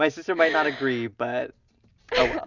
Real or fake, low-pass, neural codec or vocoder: real; 7.2 kHz; none